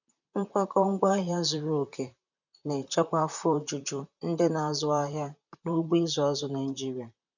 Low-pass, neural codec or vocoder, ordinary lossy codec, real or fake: 7.2 kHz; vocoder, 44.1 kHz, 128 mel bands, Pupu-Vocoder; none; fake